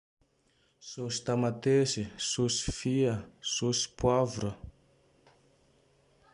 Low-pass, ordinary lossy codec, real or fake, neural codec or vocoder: 10.8 kHz; MP3, 96 kbps; real; none